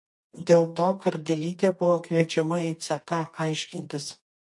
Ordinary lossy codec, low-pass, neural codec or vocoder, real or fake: MP3, 48 kbps; 10.8 kHz; codec, 24 kHz, 0.9 kbps, WavTokenizer, medium music audio release; fake